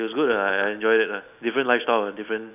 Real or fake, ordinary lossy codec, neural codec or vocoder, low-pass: real; none; none; 3.6 kHz